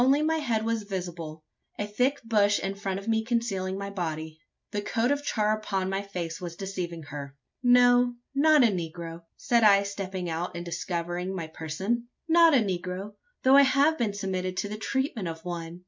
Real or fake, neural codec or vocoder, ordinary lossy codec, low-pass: real; none; MP3, 64 kbps; 7.2 kHz